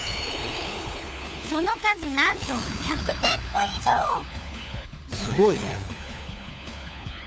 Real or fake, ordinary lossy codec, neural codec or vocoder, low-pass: fake; none; codec, 16 kHz, 4 kbps, FunCodec, trained on LibriTTS, 50 frames a second; none